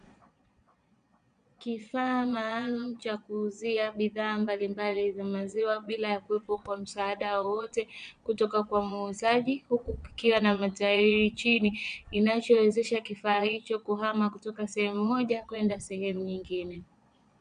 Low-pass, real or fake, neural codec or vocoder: 9.9 kHz; fake; vocoder, 22.05 kHz, 80 mel bands, Vocos